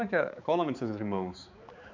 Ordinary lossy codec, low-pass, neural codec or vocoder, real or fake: MP3, 64 kbps; 7.2 kHz; codec, 16 kHz, 4 kbps, X-Codec, HuBERT features, trained on balanced general audio; fake